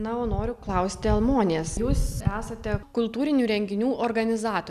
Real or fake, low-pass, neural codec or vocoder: real; 14.4 kHz; none